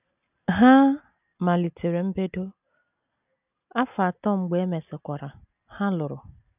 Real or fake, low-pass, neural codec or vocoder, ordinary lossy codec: real; 3.6 kHz; none; none